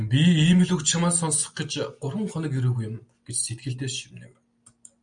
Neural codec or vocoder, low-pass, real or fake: none; 9.9 kHz; real